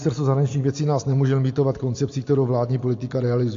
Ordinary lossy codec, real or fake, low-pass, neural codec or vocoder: AAC, 48 kbps; real; 7.2 kHz; none